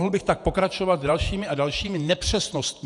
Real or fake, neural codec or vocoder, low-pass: fake; vocoder, 48 kHz, 128 mel bands, Vocos; 10.8 kHz